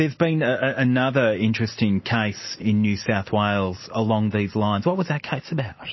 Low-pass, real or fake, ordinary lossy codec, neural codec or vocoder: 7.2 kHz; real; MP3, 24 kbps; none